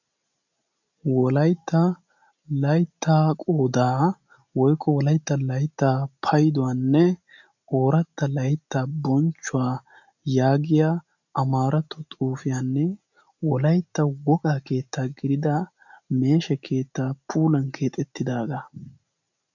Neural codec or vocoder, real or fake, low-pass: none; real; 7.2 kHz